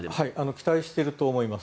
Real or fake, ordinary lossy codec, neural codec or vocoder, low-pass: real; none; none; none